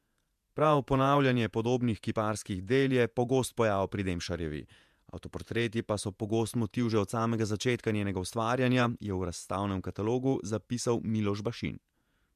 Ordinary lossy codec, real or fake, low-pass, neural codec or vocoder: MP3, 96 kbps; fake; 14.4 kHz; vocoder, 48 kHz, 128 mel bands, Vocos